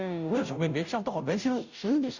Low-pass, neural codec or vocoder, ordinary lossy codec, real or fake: 7.2 kHz; codec, 16 kHz, 0.5 kbps, FunCodec, trained on Chinese and English, 25 frames a second; none; fake